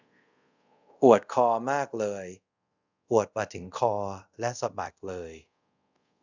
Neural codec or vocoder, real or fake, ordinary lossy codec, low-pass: codec, 24 kHz, 0.5 kbps, DualCodec; fake; none; 7.2 kHz